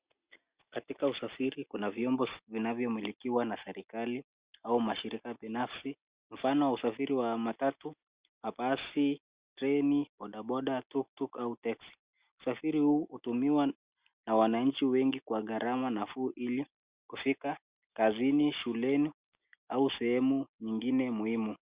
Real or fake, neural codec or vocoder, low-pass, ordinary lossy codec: real; none; 3.6 kHz; Opus, 64 kbps